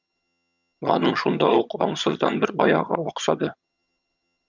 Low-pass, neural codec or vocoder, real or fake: 7.2 kHz; vocoder, 22.05 kHz, 80 mel bands, HiFi-GAN; fake